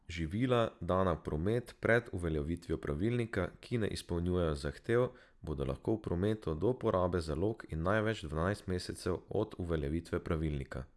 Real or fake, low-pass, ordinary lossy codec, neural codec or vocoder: real; none; none; none